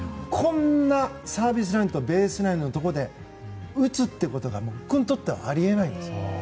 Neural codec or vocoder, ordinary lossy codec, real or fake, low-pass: none; none; real; none